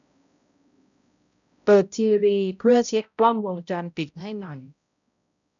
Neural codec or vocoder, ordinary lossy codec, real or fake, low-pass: codec, 16 kHz, 0.5 kbps, X-Codec, HuBERT features, trained on balanced general audio; none; fake; 7.2 kHz